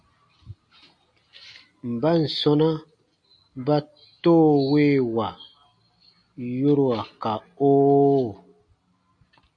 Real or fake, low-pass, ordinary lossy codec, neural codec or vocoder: real; 9.9 kHz; MP3, 48 kbps; none